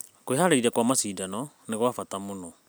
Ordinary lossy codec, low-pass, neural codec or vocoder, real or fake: none; none; none; real